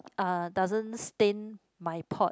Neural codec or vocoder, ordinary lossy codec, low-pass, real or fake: none; none; none; real